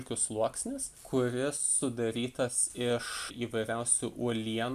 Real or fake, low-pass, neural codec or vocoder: real; 14.4 kHz; none